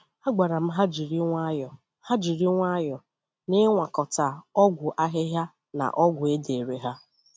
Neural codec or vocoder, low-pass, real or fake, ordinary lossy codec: none; none; real; none